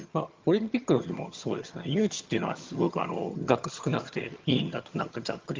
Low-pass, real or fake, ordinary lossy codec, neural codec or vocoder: 7.2 kHz; fake; Opus, 32 kbps; vocoder, 22.05 kHz, 80 mel bands, HiFi-GAN